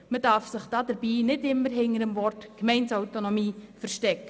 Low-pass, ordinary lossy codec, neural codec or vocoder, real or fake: none; none; none; real